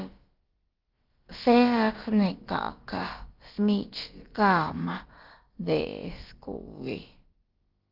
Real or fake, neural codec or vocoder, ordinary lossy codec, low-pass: fake; codec, 16 kHz, about 1 kbps, DyCAST, with the encoder's durations; Opus, 32 kbps; 5.4 kHz